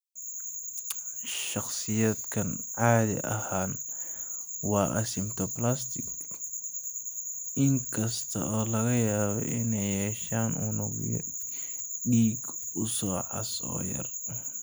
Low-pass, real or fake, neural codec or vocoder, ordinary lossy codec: none; real; none; none